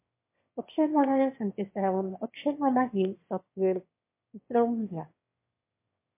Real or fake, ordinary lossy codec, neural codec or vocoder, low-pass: fake; MP3, 24 kbps; autoencoder, 22.05 kHz, a latent of 192 numbers a frame, VITS, trained on one speaker; 3.6 kHz